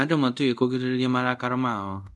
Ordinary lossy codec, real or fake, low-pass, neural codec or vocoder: none; fake; none; codec, 24 kHz, 0.5 kbps, DualCodec